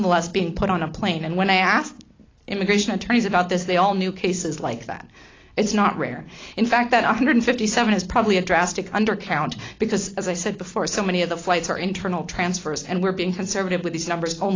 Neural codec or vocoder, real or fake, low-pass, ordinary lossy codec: none; real; 7.2 kHz; AAC, 32 kbps